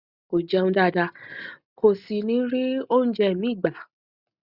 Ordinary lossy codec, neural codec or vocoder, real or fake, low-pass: Opus, 64 kbps; none; real; 5.4 kHz